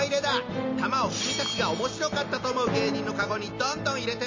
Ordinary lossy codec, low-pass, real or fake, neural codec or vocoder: MP3, 32 kbps; 7.2 kHz; real; none